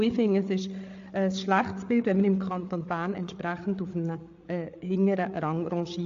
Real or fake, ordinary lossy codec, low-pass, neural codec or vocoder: fake; AAC, 48 kbps; 7.2 kHz; codec, 16 kHz, 8 kbps, FreqCodec, larger model